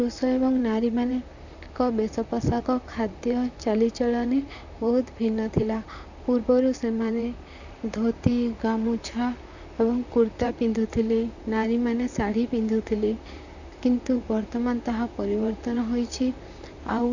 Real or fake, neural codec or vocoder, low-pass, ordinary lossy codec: fake; vocoder, 44.1 kHz, 128 mel bands, Pupu-Vocoder; 7.2 kHz; none